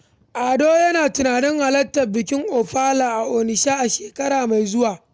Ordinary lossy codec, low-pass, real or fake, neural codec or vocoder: none; none; real; none